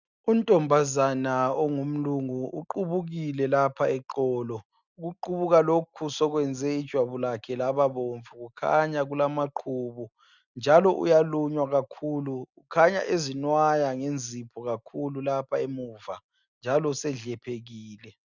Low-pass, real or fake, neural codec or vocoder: 7.2 kHz; real; none